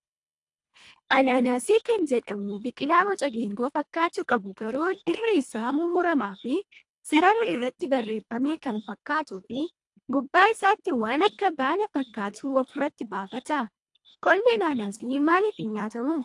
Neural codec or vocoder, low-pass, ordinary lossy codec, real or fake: codec, 24 kHz, 1.5 kbps, HILCodec; 10.8 kHz; AAC, 64 kbps; fake